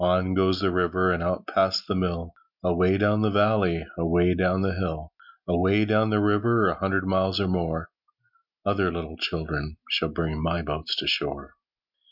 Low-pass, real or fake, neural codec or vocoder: 5.4 kHz; real; none